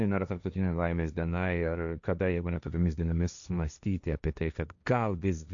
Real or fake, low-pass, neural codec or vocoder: fake; 7.2 kHz; codec, 16 kHz, 1.1 kbps, Voila-Tokenizer